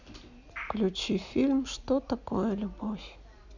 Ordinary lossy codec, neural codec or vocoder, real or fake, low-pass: none; none; real; 7.2 kHz